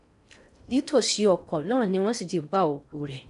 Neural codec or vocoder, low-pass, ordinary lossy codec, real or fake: codec, 16 kHz in and 24 kHz out, 0.6 kbps, FocalCodec, streaming, 4096 codes; 10.8 kHz; none; fake